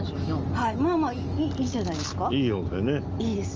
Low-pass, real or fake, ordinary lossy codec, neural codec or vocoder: 7.2 kHz; real; Opus, 24 kbps; none